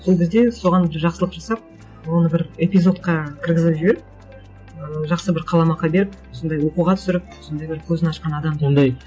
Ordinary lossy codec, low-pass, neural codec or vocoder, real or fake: none; none; none; real